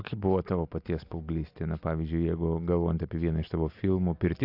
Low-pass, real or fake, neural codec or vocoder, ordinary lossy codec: 5.4 kHz; real; none; AAC, 48 kbps